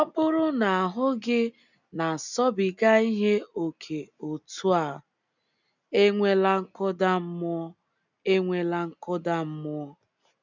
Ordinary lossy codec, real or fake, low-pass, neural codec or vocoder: none; real; 7.2 kHz; none